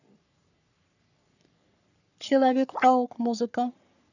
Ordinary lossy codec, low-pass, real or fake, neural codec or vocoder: none; 7.2 kHz; fake; codec, 44.1 kHz, 3.4 kbps, Pupu-Codec